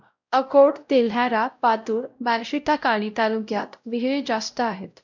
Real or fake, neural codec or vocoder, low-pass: fake; codec, 16 kHz, 0.5 kbps, X-Codec, WavLM features, trained on Multilingual LibriSpeech; 7.2 kHz